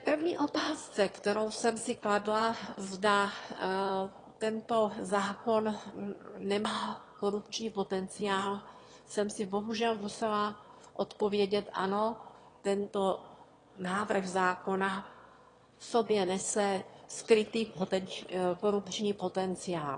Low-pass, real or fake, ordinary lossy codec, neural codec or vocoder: 9.9 kHz; fake; AAC, 32 kbps; autoencoder, 22.05 kHz, a latent of 192 numbers a frame, VITS, trained on one speaker